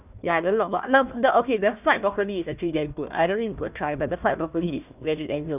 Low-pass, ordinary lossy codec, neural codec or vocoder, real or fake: 3.6 kHz; none; codec, 16 kHz, 1 kbps, FunCodec, trained on Chinese and English, 50 frames a second; fake